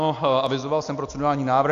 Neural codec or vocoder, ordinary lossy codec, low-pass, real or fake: none; AAC, 64 kbps; 7.2 kHz; real